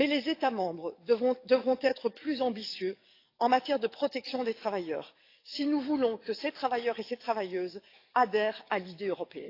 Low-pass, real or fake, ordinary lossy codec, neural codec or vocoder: 5.4 kHz; fake; AAC, 32 kbps; codec, 44.1 kHz, 7.8 kbps, DAC